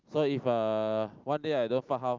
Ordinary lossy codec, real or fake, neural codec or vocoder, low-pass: Opus, 24 kbps; real; none; 7.2 kHz